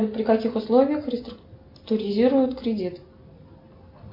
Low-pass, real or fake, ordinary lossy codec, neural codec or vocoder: 5.4 kHz; real; MP3, 32 kbps; none